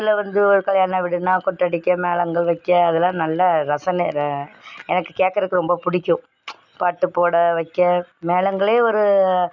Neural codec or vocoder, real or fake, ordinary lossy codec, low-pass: none; real; none; 7.2 kHz